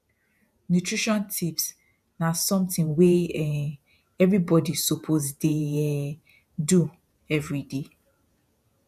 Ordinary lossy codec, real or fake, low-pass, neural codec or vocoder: none; fake; 14.4 kHz; vocoder, 48 kHz, 128 mel bands, Vocos